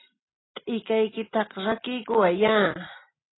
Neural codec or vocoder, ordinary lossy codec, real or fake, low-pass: none; AAC, 16 kbps; real; 7.2 kHz